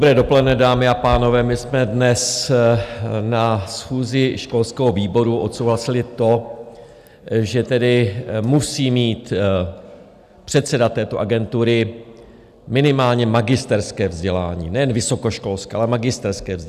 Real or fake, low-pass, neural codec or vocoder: real; 14.4 kHz; none